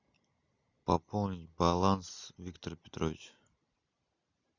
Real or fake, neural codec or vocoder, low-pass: real; none; 7.2 kHz